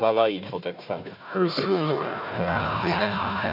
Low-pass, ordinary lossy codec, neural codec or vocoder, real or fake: 5.4 kHz; none; codec, 16 kHz, 1 kbps, FunCodec, trained on Chinese and English, 50 frames a second; fake